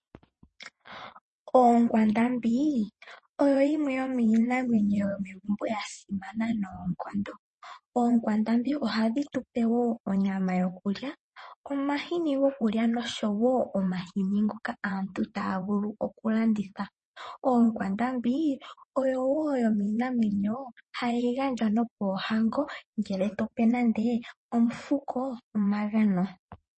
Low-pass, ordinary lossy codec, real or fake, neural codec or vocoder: 9.9 kHz; MP3, 32 kbps; fake; vocoder, 22.05 kHz, 80 mel bands, WaveNeXt